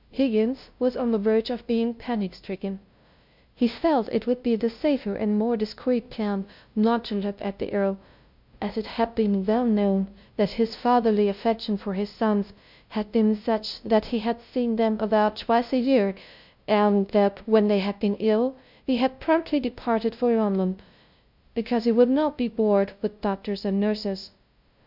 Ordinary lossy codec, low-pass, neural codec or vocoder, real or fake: MP3, 48 kbps; 5.4 kHz; codec, 16 kHz, 0.5 kbps, FunCodec, trained on LibriTTS, 25 frames a second; fake